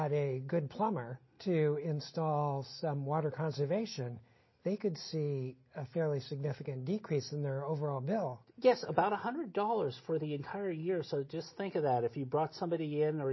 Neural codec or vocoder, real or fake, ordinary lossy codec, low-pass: none; real; MP3, 24 kbps; 7.2 kHz